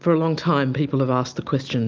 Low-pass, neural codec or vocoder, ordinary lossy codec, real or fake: 7.2 kHz; none; Opus, 32 kbps; real